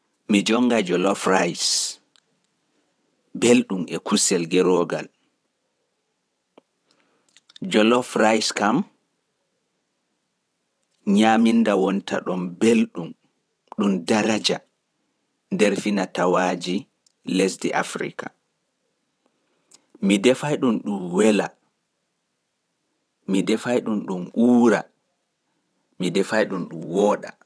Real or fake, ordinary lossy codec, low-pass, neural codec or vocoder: fake; none; none; vocoder, 22.05 kHz, 80 mel bands, WaveNeXt